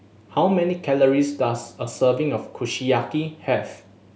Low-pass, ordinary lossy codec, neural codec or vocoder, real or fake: none; none; none; real